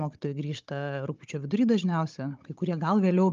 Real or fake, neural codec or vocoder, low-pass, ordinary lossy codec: fake; codec, 16 kHz, 16 kbps, FunCodec, trained on Chinese and English, 50 frames a second; 7.2 kHz; Opus, 24 kbps